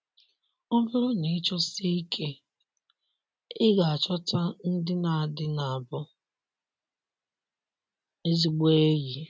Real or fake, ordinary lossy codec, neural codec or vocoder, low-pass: real; none; none; none